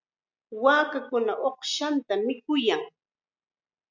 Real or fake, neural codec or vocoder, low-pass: real; none; 7.2 kHz